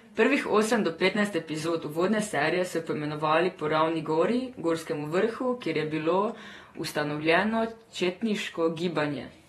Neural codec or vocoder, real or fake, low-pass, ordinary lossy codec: vocoder, 48 kHz, 128 mel bands, Vocos; fake; 19.8 kHz; AAC, 32 kbps